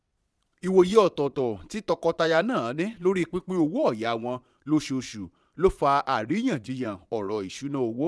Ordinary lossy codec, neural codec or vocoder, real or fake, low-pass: none; vocoder, 22.05 kHz, 80 mel bands, Vocos; fake; none